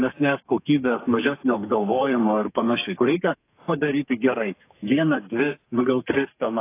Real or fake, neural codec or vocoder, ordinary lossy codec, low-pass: fake; codec, 32 kHz, 1.9 kbps, SNAC; AAC, 24 kbps; 3.6 kHz